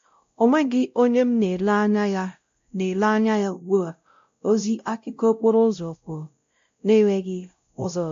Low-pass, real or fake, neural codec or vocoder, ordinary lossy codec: 7.2 kHz; fake; codec, 16 kHz, 0.5 kbps, X-Codec, WavLM features, trained on Multilingual LibriSpeech; MP3, 48 kbps